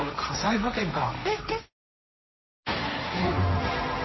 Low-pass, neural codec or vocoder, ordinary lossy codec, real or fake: 7.2 kHz; codec, 16 kHz, 1.1 kbps, Voila-Tokenizer; MP3, 24 kbps; fake